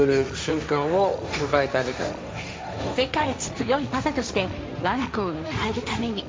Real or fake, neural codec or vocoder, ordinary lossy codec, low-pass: fake; codec, 16 kHz, 1.1 kbps, Voila-Tokenizer; none; none